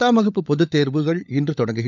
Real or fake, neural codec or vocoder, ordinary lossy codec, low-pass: fake; codec, 16 kHz, 16 kbps, FunCodec, trained on LibriTTS, 50 frames a second; none; 7.2 kHz